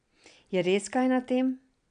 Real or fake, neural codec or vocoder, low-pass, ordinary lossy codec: real; none; 9.9 kHz; none